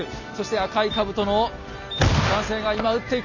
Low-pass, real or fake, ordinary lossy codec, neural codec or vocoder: 7.2 kHz; real; none; none